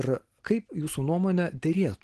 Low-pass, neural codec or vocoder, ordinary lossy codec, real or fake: 10.8 kHz; none; Opus, 16 kbps; real